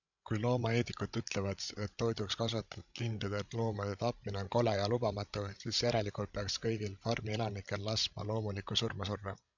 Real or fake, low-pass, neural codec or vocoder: fake; 7.2 kHz; codec, 16 kHz, 16 kbps, FreqCodec, larger model